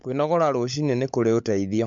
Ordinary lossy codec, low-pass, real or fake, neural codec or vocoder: MP3, 64 kbps; 7.2 kHz; fake; codec, 16 kHz, 16 kbps, FunCodec, trained on LibriTTS, 50 frames a second